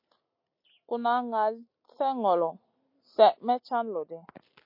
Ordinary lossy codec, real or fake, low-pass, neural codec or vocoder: MP3, 32 kbps; real; 5.4 kHz; none